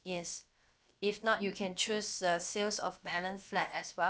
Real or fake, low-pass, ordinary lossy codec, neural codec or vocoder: fake; none; none; codec, 16 kHz, about 1 kbps, DyCAST, with the encoder's durations